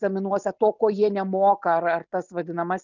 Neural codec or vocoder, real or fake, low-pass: none; real; 7.2 kHz